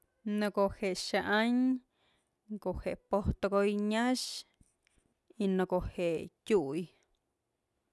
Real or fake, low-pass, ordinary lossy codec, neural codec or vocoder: real; none; none; none